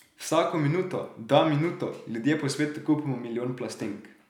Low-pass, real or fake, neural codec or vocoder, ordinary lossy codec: 19.8 kHz; real; none; none